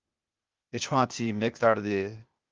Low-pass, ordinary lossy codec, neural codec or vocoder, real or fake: 7.2 kHz; Opus, 32 kbps; codec, 16 kHz, 0.8 kbps, ZipCodec; fake